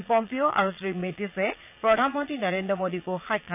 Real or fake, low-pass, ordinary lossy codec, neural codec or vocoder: fake; 3.6 kHz; none; vocoder, 22.05 kHz, 80 mel bands, Vocos